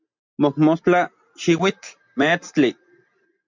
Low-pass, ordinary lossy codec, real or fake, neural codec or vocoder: 7.2 kHz; MP3, 64 kbps; real; none